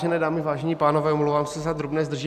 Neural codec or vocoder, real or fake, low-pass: none; real; 14.4 kHz